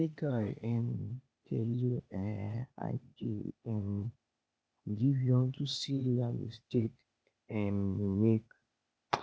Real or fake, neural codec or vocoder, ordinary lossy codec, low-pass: fake; codec, 16 kHz, 0.8 kbps, ZipCodec; none; none